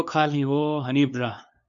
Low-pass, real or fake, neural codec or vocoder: 7.2 kHz; fake; codec, 16 kHz, 2 kbps, FunCodec, trained on LibriTTS, 25 frames a second